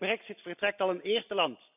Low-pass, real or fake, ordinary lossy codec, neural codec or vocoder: 3.6 kHz; real; none; none